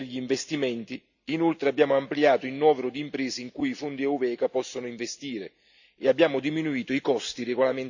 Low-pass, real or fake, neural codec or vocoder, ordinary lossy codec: 7.2 kHz; real; none; none